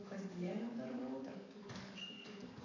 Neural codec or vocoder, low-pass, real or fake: codec, 44.1 kHz, 7.8 kbps, DAC; 7.2 kHz; fake